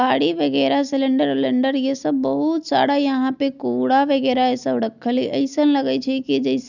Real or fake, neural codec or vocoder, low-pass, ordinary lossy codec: real; none; 7.2 kHz; none